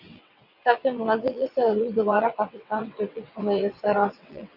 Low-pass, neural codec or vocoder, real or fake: 5.4 kHz; vocoder, 22.05 kHz, 80 mel bands, WaveNeXt; fake